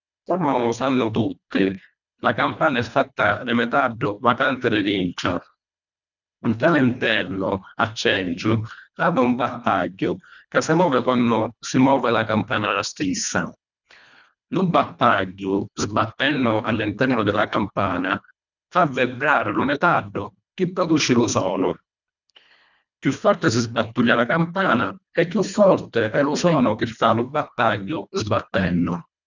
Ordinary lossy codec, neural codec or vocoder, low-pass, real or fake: none; codec, 24 kHz, 1.5 kbps, HILCodec; 7.2 kHz; fake